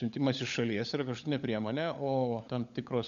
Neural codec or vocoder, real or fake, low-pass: codec, 16 kHz, 16 kbps, FunCodec, trained on LibriTTS, 50 frames a second; fake; 7.2 kHz